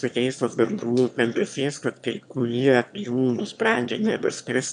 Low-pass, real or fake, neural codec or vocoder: 9.9 kHz; fake; autoencoder, 22.05 kHz, a latent of 192 numbers a frame, VITS, trained on one speaker